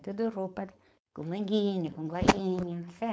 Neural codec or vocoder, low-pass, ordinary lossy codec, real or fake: codec, 16 kHz, 4.8 kbps, FACodec; none; none; fake